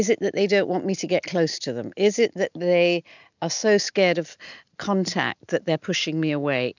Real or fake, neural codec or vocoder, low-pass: real; none; 7.2 kHz